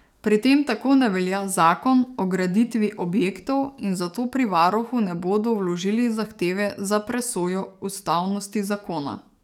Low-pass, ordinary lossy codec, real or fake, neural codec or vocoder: 19.8 kHz; none; fake; codec, 44.1 kHz, 7.8 kbps, DAC